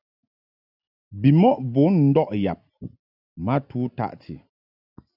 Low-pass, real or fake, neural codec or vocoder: 5.4 kHz; real; none